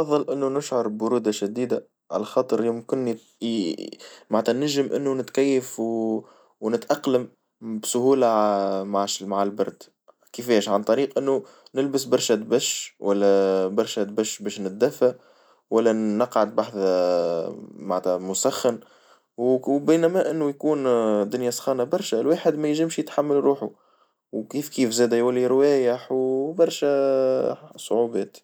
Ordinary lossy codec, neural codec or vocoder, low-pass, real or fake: none; none; none; real